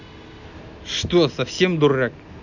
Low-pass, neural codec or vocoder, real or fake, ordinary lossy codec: 7.2 kHz; none; real; none